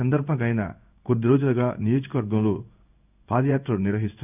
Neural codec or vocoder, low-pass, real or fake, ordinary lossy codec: codec, 16 kHz in and 24 kHz out, 1 kbps, XY-Tokenizer; 3.6 kHz; fake; none